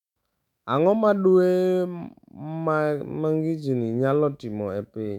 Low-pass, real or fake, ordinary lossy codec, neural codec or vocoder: 19.8 kHz; fake; none; autoencoder, 48 kHz, 128 numbers a frame, DAC-VAE, trained on Japanese speech